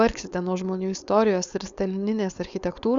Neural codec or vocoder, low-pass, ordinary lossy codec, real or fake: codec, 16 kHz, 4.8 kbps, FACodec; 7.2 kHz; Opus, 64 kbps; fake